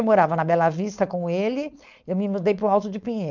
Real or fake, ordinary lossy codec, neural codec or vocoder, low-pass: fake; none; codec, 16 kHz, 4.8 kbps, FACodec; 7.2 kHz